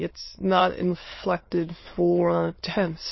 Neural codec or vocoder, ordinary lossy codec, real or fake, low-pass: autoencoder, 22.05 kHz, a latent of 192 numbers a frame, VITS, trained on many speakers; MP3, 24 kbps; fake; 7.2 kHz